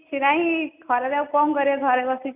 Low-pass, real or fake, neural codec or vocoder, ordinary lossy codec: 3.6 kHz; real; none; none